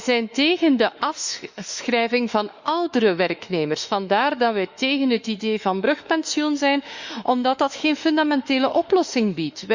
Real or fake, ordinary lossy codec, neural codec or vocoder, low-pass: fake; Opus, 64 kbps; autoencoder, 48 kHz, 32 numbers a frame, DAC-VAE, trained on Japanese speech; 7.2 kHz